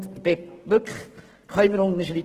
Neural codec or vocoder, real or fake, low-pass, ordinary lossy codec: codec, 44.1 kHz, 7.8 kbps, Pupu-Codec; fake; 14.4 kHz; Opus, 16 kbps